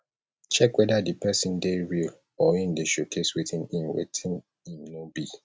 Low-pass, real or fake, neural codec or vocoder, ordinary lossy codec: none; real; none; none